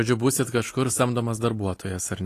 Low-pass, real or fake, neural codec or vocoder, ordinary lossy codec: 14.4 kHz; real; none; AAC, 48 kbps